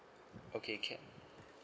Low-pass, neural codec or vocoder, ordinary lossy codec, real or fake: none; none; none; real